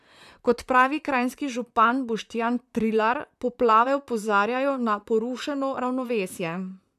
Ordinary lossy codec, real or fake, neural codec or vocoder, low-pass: none; fake; vocoder, 44.1 kHz, 128 mel bands, Pupu-Vocoder; 14.4 kHz